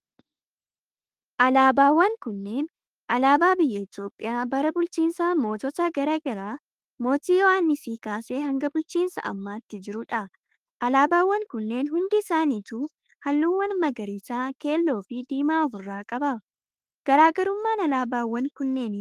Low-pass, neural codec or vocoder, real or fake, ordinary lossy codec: 14.4 kHz; autoencoder, 48 kHz, 32 numbers a frame, DAC-VAE, trained on Japanese speech; fake; Opus, 24 kbps